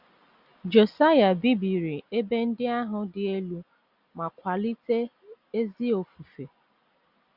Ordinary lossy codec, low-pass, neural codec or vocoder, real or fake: Opus, 64 kbps; 5.4 kHz; none; real